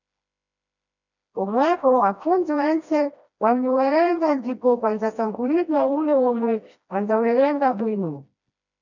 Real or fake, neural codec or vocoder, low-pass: fake; codec, 16 kHz, 1 kbps, FreqCodec, smaller model; 7.2 kHz